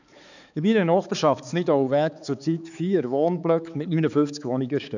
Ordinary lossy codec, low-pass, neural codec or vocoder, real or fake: none; 7.2 kHz; codec, 16 kHz, 4 kbps, X-Codec, HuBERT features, trained on balanced general audio; fake